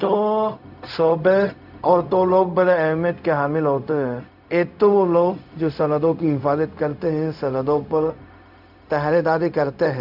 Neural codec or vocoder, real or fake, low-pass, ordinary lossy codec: codec, 16 kHz, 0.4 kbps, LongCat-Audio-Codec; fake; 5.4 kHz; AAC, 48 kbps